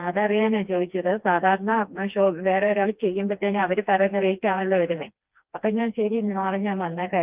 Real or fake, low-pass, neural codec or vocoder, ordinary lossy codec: fake; 3.6 kHz; codec, 16 kHz, 2 kbps, FreqCodec, smaller model; Opus, 32 kbps